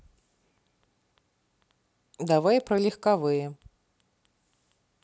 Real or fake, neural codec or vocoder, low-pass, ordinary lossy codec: real; none; none; none